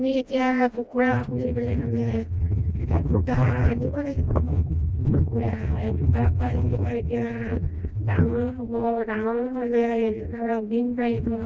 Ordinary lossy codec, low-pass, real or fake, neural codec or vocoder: none; none; fake; codec, 16 kHz, 1 kbps, FreqCodec, smaller model